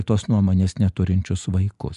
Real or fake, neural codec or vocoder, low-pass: real; none; 10.8 kHz